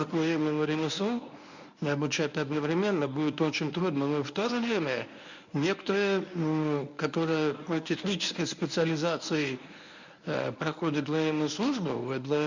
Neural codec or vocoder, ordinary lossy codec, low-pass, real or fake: codec, 24 kHz, 0.9 kbps, WavTokenizer, medium speech release version 1; none; 7.2 kHz; fake